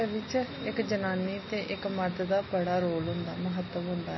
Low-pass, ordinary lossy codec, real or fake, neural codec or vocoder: 7.2 kHz; MP3, 24 kbps; real; none